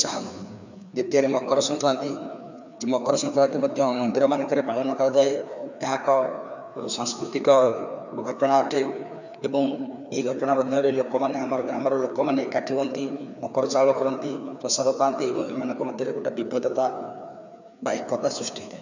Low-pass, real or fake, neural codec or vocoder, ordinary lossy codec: 7.2 kHz; fake; codec, 16 kHz, 2 kbps, FreqCodec, larger model; none